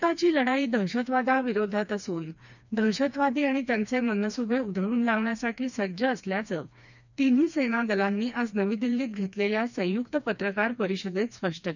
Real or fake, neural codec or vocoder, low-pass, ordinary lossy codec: fake; codec, 16 kHz, 2 kbps, FreqCodec, smaller model; 7.2 kHz; none